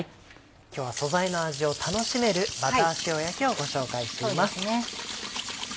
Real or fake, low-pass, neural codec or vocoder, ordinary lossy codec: real; none; none; none